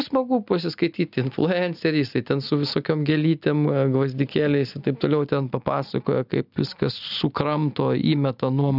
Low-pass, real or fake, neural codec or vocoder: 5.4 kHz; real; none